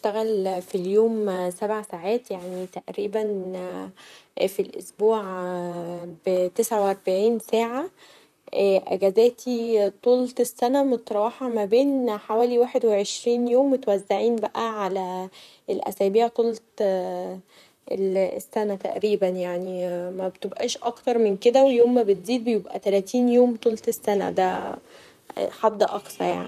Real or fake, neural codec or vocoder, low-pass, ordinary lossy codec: fake; vocoder, 44.1 kHz, 128 mel bands, Pupu-Vocoder; 14.4 kHz; none